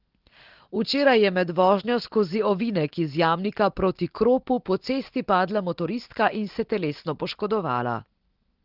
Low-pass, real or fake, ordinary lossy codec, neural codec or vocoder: 5.4 kHz; real; Opus, 16 kbps; none